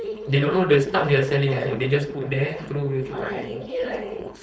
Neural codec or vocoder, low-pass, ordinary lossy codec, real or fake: codec, 16 kHz, 4.8 kbps, FACodec; none; none; fake